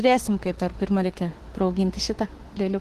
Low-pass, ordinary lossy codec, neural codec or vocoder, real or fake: 14.4 kHz; Opus, 16 kbps; autoencoder, 48 kHz, 32 numbers a frame, DAC-VAE, trained on Japanese speech; fake